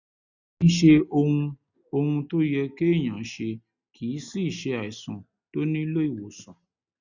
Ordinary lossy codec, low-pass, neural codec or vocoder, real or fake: none; 7.2 kHz; none; real